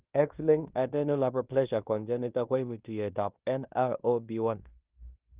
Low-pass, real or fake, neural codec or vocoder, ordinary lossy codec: 3.6 kHz; fake; codec, 16 kHz in and 24 kHz out, 0.9 kbps, LongCat-Audio-Codec, fine tuned four codebook decoder; Opus, 32 kbps